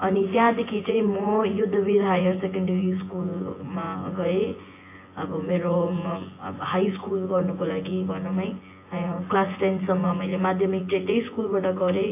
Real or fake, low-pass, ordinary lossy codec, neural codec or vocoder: fake; 3.6 kHz; AAC, 24 kbps; vocoder, 24 kHz, 100 mel bands, Vocos